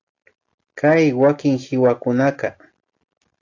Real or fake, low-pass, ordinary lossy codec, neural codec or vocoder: real; 7.2 kHz; AAC, 48 kbps; none